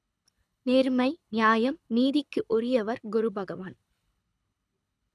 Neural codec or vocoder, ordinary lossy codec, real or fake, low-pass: codec, 24 kHz, 6 kbps, HILCodec; none; fake; none